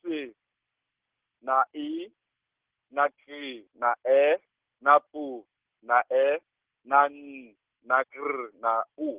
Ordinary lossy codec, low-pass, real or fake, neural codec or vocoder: Opus, 16 kbps; 3.6 kHz; real; none